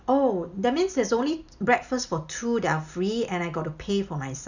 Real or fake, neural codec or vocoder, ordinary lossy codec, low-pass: real; none; none; 7.2 kHz